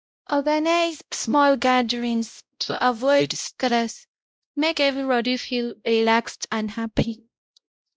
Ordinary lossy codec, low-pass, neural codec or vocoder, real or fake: none; none; codec, 16 kHz, 0.5 kbps, X-Codec, WavLM features, trained on Multilingual LibriSpeech; fake